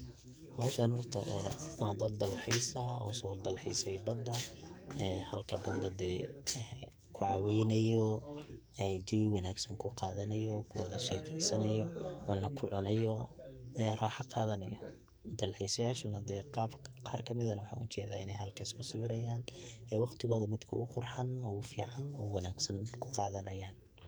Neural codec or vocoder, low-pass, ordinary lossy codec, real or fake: codec, 44.1 kHz, 2.6 kbps, SNAC; none; none; fake